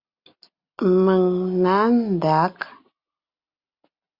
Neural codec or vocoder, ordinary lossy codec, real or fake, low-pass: none; Opus, 64 kbps; real; 5.4 kHz